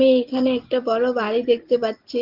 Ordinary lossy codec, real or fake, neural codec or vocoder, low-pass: Opus, 16 kbps; real; none; 5.4 kHz